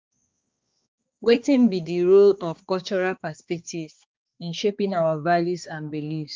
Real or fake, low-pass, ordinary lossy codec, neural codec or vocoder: fake; 7.2 kHz; Opus, 32 kbps; codec, 16 kHz, 2 kbps, X-Codec, HuBERT features, trained on balanced general audio